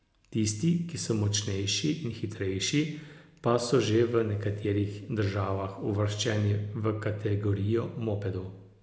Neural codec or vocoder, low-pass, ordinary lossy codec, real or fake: none; none; none; real